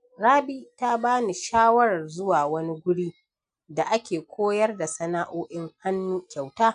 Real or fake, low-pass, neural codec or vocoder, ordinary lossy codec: real; 9.9 kHz; none; AAC, 96 kbps